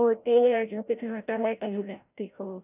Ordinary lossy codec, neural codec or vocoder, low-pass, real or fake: none; codec, 16 kHz, 0.5 kbps, FreqCodec, larger model; 3.6 kHz; fake